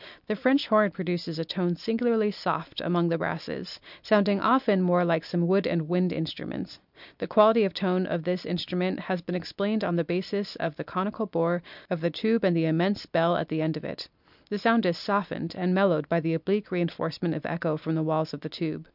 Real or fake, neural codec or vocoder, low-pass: real; none; 5.4 kHz